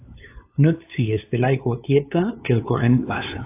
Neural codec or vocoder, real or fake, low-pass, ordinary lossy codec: codec, 16 kHz, 2 kbps, FunCodec, trained on LibriTTS, 25 frames a second; fake; 3.6 kHz; AAC, 24 kbps